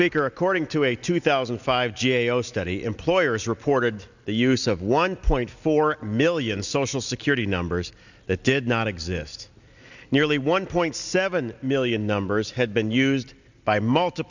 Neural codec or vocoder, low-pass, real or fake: none; 7.2 kHz; real